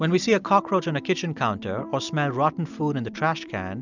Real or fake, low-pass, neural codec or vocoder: fake; 7.2 kHz; vocoder, 44.1 kHz, 128 mel bands every 256 samples, BigVGAN v2